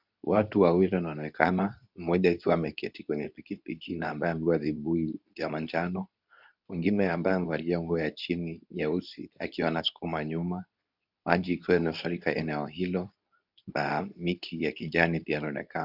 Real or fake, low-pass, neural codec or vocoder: fake; 5.4 kHz; codec, 24 kHz, 0.9 kbps, WavTokenizer, medium speech release version 2